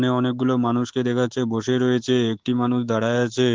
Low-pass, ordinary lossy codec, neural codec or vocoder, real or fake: 7.2 kHz; Opus, 16 kbps; codec, 24 kHz, 3.1 kbps, DualCodec; fake